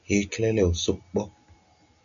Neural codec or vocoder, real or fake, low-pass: none; real; 7.2 kHz